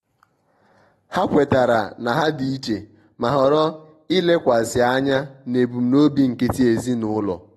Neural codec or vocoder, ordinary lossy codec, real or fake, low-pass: none; AAC, 32 kbps; real; 14.4 kHz